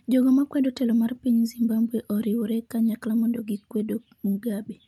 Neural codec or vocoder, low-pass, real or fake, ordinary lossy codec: none; 19.8 kHz; real; none